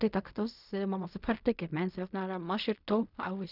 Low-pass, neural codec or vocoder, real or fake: 5.4 kHz; codec, 16 kHz in and 24 kHz out, 0.4 kbps, LongCat-Audio-Codec, fine tuned four codebook decoder; fake